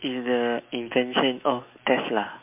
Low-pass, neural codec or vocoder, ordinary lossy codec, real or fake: 3.6 kHz; autoencoder, 48 kHz, 128 numbers a frame, DAC-VAE, trained on Japanese speech; MP3, 32 kbps; fake